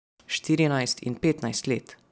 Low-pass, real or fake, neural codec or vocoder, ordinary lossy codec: none; real; none; none